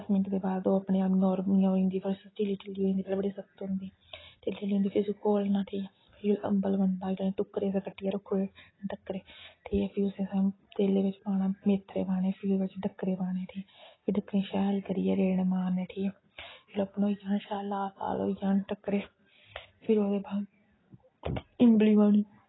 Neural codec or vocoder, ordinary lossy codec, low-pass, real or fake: none; AAC, 16 kbps; 7.2 kHz; real